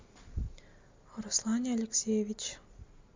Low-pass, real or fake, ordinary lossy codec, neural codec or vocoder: 7.2 kHz; real; MP3, 64 kbps; none